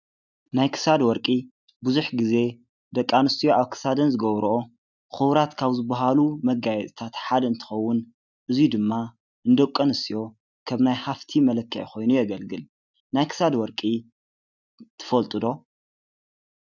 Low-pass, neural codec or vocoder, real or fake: 7.2 kHz; none; real